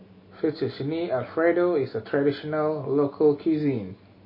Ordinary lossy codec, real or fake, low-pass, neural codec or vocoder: MP3, 24 kbps; real; 5.4 kHz; none